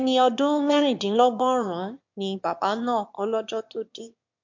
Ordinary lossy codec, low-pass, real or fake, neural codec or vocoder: MP3, 48 kbps; 7.2 kHz; fake; autoencoder, 22.05 kHz, a latent of 192 numbers a frame, VITS, trained on one speaker